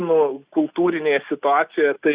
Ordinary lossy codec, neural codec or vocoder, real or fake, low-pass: Opus, 64 kbps; none; real; 3.6 kHz